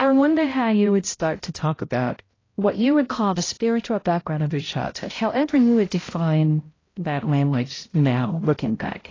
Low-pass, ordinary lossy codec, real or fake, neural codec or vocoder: 7.2 kHz; AAC, 32 kbps; fake; codec, 16 kHz, 0.5 kbps, X-Codec, HuBERT features, trained on balanced general audio